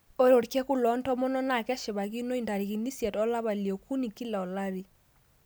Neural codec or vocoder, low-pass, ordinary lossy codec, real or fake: none; none; none; real